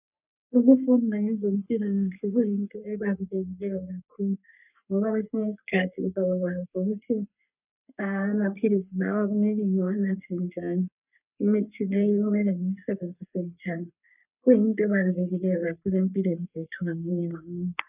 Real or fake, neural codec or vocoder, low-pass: fake; codec, 44.1 kHz, 3.4 kbps, Pupu-Codec; 3.6 kHz